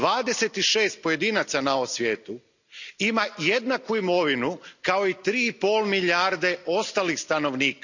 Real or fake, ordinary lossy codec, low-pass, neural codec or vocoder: real; none; 7.2 kHz; none